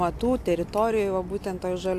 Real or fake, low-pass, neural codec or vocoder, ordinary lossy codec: real; 14.4 kHz; none; AAC, 64 kbps